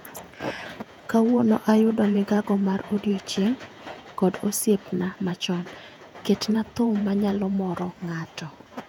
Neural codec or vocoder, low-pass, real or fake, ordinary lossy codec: vocoder, 48 kHz, 128 mel bands, Vocos; 19.8 kHz; fake; none